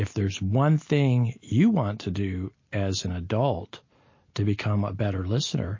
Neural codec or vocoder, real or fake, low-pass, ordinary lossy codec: none; real; 7.2 kHz; MP3, 32 kbps